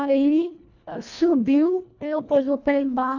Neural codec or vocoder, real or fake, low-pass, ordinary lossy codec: codec, 24 kHz, 1.5 kbps, HILCodec; fake; 7.2 kHz; none